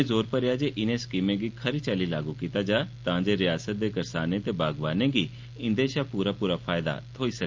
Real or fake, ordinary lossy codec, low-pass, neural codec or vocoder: real; Opus, 24 kbps; 7.2 kHz; none